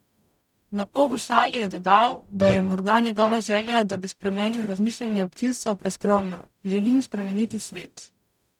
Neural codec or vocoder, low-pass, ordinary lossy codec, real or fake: codec, 44.1 kHz, 0.9 kbps, DAC; 19.8 kHz; none; fake